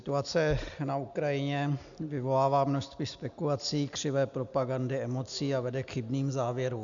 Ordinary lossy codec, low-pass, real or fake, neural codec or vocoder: MP3, 96 kbps; 7.2 kHz; real; none